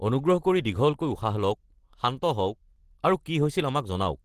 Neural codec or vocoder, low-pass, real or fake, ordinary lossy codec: none; 14.4 kHz; real; Opus, 16 kbps